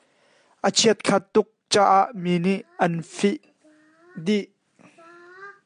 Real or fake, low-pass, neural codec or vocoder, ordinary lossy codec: real; 9.9 kHz; none; AAC, 96 kbps